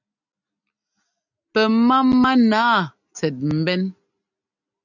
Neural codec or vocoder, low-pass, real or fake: none; 7.2 kHz; real